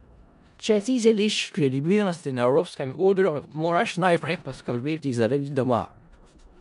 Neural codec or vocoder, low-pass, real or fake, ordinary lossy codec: codec, 16 kHz in and 24 kHz out, 0.4 kbps, LongCat-Audio-Codec, four codebook decoder; 10.8 kHz; fake; none